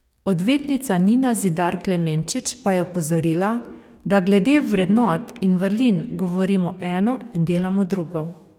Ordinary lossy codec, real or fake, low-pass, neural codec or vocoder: none; fake; 19.8 kHz; codec, 44.1 kHz, 2.6 kbps, DAC